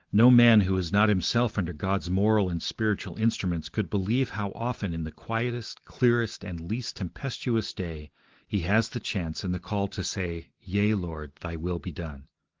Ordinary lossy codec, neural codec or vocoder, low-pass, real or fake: Opus, 16 kbps; none; 7.2 kHz; real